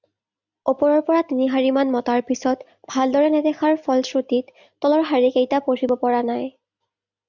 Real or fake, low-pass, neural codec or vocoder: real; 7.2 kHz; none